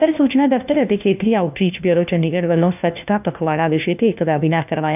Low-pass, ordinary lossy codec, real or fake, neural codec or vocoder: 3.6 kHz; none; fake; codec, 16 kHz, 1 kbps, FunCodec, trained on LibriTTS, 50 frames a second